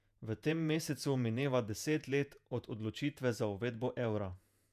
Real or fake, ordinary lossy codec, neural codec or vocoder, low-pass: fake; none; vocoder, 48 kHz, 128 mel bands, Vocos; 14.4 kHz